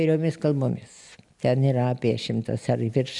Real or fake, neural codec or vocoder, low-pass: real; none; 10.8 kHz